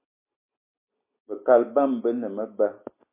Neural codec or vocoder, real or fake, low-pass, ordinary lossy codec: none; real; 3.6 kHz; MP3, 24 kbps